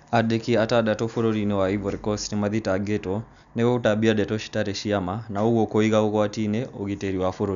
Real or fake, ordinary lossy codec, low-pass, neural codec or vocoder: real; none; 7.2 kHz; none